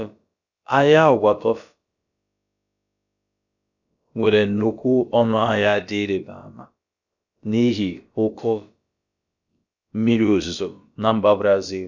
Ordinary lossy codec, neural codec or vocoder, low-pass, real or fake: none; codec, 16 kHz, about 1 kbps, DyCAST, with the encoder's durations; 7.2 kHz; fake